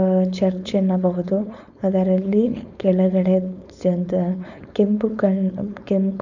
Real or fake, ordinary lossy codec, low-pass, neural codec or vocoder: fake; none; 7.2 kHz; codec, 16 kHz, 4.8 kbps, FACodec